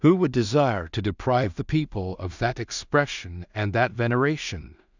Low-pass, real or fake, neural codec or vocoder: 7.2 kHz; fake; codec, 16 kHz in and 24 kHz out, 0.4 kbps, LongCat-Audio-Codec, two codebook decoder